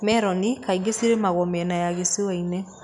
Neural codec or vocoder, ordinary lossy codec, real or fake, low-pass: none; none; real; 10.8 kHz